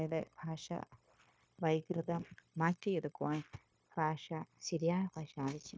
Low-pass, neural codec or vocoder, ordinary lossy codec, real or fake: none; codec, 16 kHz, 0.9 kbps, LongCat-Audio-Codec; none; fake